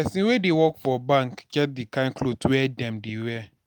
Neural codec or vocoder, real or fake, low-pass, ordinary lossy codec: none; real; none; none